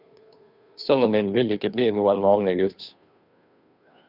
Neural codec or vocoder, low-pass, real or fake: codec, 16 kHz, 2 kbps, FunCodec, trained on Chinese and English, 25 frames a second; 5.4 kHz; fake